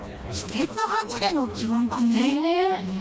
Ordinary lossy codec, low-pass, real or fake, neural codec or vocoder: none; none; fake; codec, 16 kHz, 1 kbps, FreqCodec, smaller model